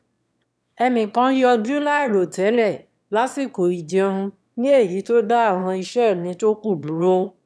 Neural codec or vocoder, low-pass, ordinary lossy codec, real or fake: autoencoder, 22.05 kHz, a latent of 192 numbers a frame, VITS, trained on one speaker; none; none; fake